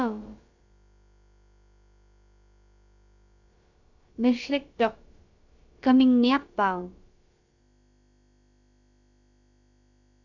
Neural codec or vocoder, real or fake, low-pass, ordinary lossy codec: codec, 16 kHz, about 1 kbps, DyCAST, with the encoder's durations; fake; 7.2 kHz; Opus, 64 kbps